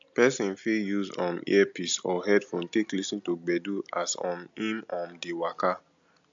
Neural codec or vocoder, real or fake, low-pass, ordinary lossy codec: none; real; 7.2 kHz; AAC, 64 kbps